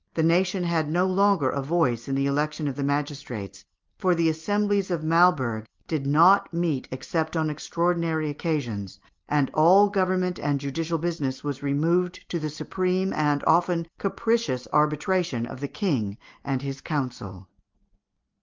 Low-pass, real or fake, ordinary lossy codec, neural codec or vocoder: 7.2 kHz; real; Opus, 32 kbps; none